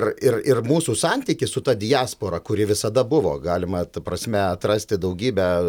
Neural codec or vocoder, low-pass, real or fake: none; 19.8 kHz; real